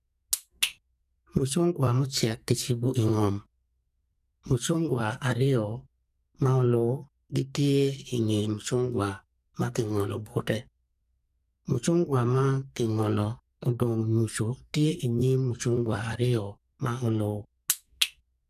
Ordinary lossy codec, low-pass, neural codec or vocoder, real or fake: none; 14.4 kHz; codec, 44.1 kHz, 2.6 kbps, SNAC; fake